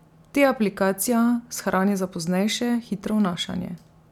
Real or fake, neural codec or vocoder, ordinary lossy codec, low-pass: real; none; none; 19.8 kHz